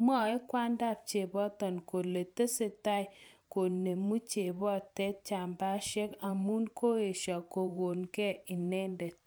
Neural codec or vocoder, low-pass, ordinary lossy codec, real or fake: vocoder, 44.1 kHz, 128 mel bands every 512 samples, BigVGAN v2; none; none; fake